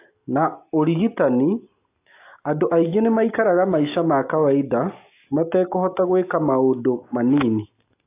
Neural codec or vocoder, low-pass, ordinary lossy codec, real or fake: none; 3.6 kHz; AAC, 24 kbps; real